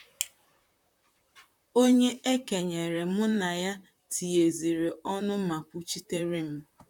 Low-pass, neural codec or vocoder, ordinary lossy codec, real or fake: 19.8 kHz; vocoder, 44.1 kHz, 128 mel bands, Pupu-Vocoder; none; fake